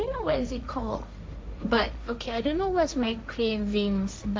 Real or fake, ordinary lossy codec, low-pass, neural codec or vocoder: fake; none; none; codec, 16 kHz, 1.1 kbps, Voila-Tokenizer